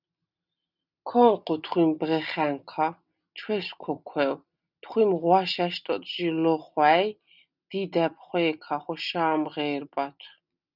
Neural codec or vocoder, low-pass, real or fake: none; 5.4 kHz; real